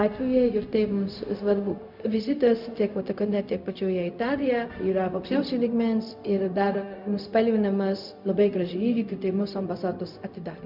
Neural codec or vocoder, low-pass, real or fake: codec, 16 kHz, 0.4 kbps, LongCat-Audio-Codec; 5.4 kHz; fake